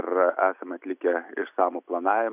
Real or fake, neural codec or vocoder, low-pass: real; none; 3.6 kHz